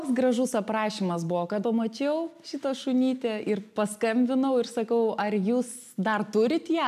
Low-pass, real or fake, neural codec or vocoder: 14.4 kHz; real; none